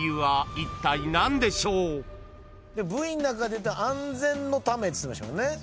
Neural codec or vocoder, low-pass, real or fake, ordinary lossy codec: none; none; real; none